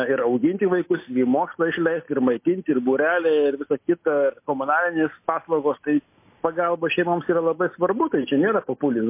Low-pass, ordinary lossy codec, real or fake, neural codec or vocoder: 3.6 kHz; MP3, 24 kbps; real; none